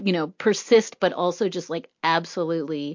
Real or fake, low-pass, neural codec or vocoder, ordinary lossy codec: real; 7.2 kHz; none; MP3, 48 kbps